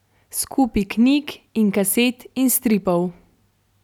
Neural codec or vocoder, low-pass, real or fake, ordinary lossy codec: none; 19.8 kHz; real; none